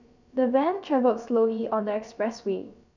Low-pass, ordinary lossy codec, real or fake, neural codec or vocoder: 7.2 kHz; none; fake; codec, 16 kHz, about 1 kbps, DyCAST, with the encoder's durations